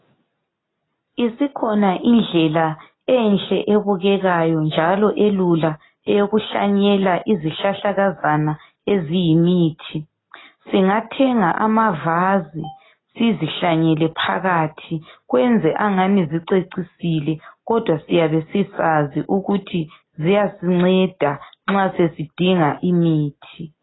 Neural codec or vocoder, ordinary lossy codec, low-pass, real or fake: none; AAC, 16 kbps; 7.2 kHz; real